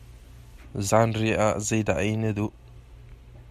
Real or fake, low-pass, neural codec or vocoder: real; 14.4 kHz; none